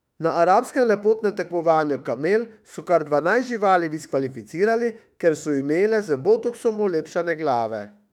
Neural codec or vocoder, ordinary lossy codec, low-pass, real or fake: autoencoder, 48 kHz, 32 numbers a frame, DAC-VAE, trained on Japanese speech; none; 19.8 kHz; fake